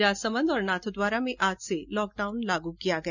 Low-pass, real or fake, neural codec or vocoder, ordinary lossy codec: 7.2 kHz; real; none; none